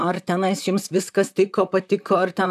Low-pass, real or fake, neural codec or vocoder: 14.4 kHz; fake; vocoder, 44.1 kHz, 128 mel bands, Pupu-Vocoder